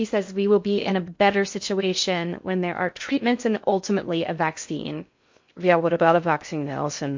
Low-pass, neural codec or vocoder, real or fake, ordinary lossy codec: 7.2 kHz; codec, 16 kHz in and 24 kHz out, 0.6 kbps, FocalCodec, streaming, 2048 codes; fake; MP3, 48 kbps